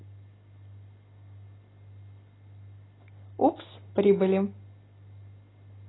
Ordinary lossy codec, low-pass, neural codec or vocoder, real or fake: AAC, 16 kbps; 7.2 kHz; none; real